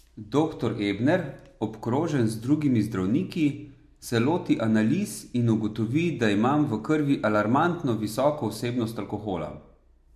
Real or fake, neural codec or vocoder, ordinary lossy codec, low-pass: real; none; MP3, 64 kbps; 14.4 kHz